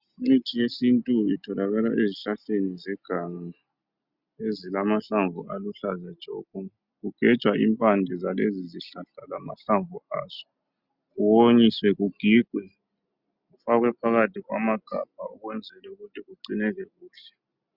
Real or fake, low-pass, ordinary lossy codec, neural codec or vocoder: real; 5.4 kHz; Opus, 64 kbps; none